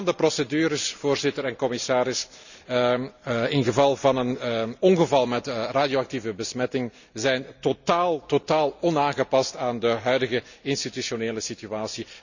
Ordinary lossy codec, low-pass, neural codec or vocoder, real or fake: none; 7.2 kHz; none; real